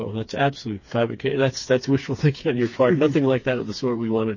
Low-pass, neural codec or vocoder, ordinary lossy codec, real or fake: 7.2 kHz; codec, 16 kHz, 4 kbps, FreqCodec, smaller model; MP3, 32 kbps; fake